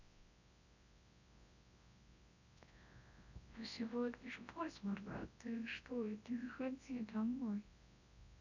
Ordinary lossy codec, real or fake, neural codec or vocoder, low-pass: none; fake; codec, 24 kHz, 0.9 kbps, WavTokenizer, large speech release; 7.2 kHz